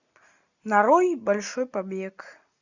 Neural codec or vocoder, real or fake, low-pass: none; real; 7.2 kHz